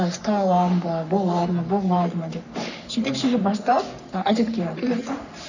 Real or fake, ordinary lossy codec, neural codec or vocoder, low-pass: fake; none; codec, 44.1 kHz, 3.4 kbps, Pupu-Codec; 7.2 kHz